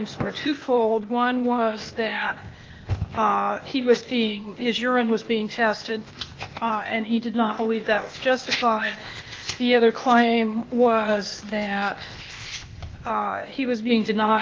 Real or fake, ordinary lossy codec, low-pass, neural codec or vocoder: fake; Opus, 24 kbps; 7.2 kHz; codec, 16 kHz, 0.8 kbps, ZipCodec